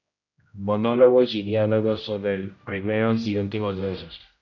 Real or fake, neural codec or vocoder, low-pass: fake; codec, 16 kHz, 0.5 kbps, X-Codec, HuBERT features, trained on general audio; 7.2 kHz